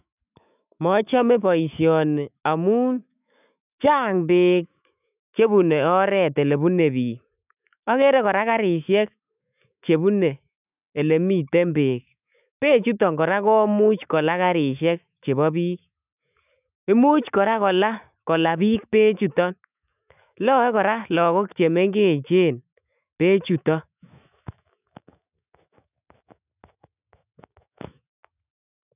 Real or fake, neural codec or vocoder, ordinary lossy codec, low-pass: fake; autoencoder, 48 kHz, 128 numbers a frame, DAC-VAE, trained on Japanese speech; none; 3.6 kHz